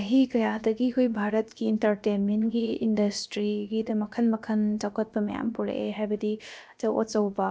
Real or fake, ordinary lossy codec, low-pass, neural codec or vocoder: fake; none; none; codec, 16 kHz, about 1 kbps, DyCAST, with the encoder's durations